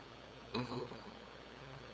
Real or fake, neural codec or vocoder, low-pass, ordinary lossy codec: fake; codec, 16 kHz, 8 kbps, FunCodec, trained on LibriTTS, 25 frames a second; none; none